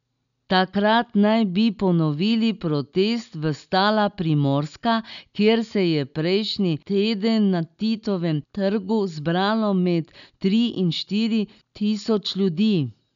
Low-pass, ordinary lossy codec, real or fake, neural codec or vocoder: 7.2 kHz; none; real; none